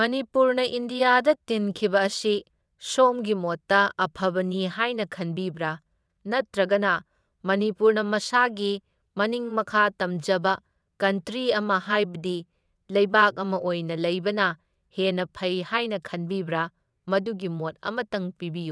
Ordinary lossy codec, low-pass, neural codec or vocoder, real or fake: none; none; vocoder, 22.05 kHz, 80 mel bands, WaveNeXt; fake